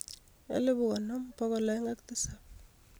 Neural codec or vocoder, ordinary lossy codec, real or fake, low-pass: none; none; real; none